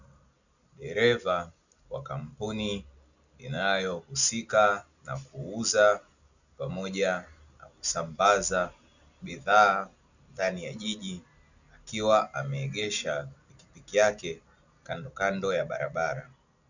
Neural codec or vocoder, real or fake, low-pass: none; real; 7.2 kHz